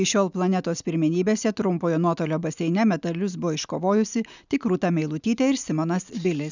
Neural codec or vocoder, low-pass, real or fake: none; 7.2 kHz; real